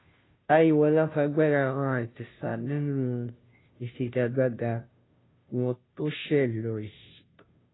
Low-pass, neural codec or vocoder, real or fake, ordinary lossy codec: 7.2 kHz; codec, 16 kHz, 0.5 kbps, FunCodec, trained on Chinese and English, 25 frames a second; fake; AAC, 16 kbps